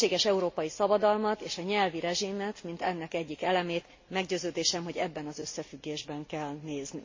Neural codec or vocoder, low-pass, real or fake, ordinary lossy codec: none; 7.2 kHz; real; MP3, 32 kbps